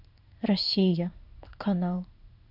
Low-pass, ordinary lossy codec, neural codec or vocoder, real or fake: 5.4 kHz; none; codec, 16 kHz in and 24 kHz out, 1 kbps, XY-Tokenizer; fake